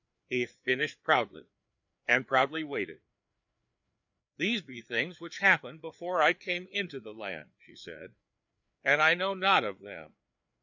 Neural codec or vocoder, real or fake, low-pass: codec, 16 kHz, 4 kbps, FreqCodec, larger model; fake; 7.2 kHz